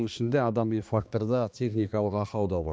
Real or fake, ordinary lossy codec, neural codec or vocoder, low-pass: fake; none; codec, 16 kHz, 2 kbps, X-Codec, HuBERT features, trained on balanced general audio; none